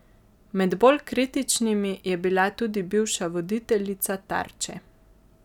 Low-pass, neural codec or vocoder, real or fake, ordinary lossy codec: 19.8 kHz; none; real; none